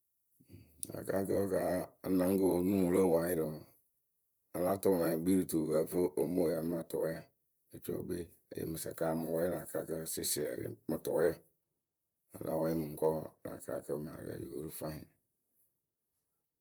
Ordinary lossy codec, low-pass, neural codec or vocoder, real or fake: none; none; vocoder, 44.1 kHz, 128 mel bands, Pupu-Vocoder; fake